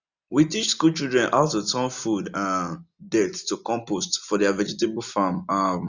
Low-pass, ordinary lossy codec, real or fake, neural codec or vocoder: 7.2 kHz; none; real; none